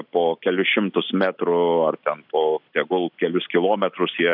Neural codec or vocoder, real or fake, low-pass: none; real; 5.4 kHz